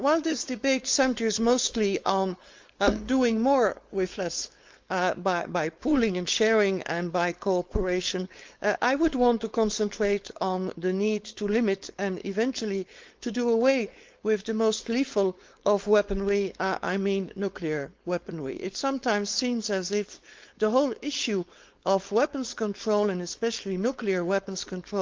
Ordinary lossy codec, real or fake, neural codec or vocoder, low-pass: Opus, 32 kbps; fake; codec, 16 kHz, 4.8 kbps, FACodec; 7.2 kHz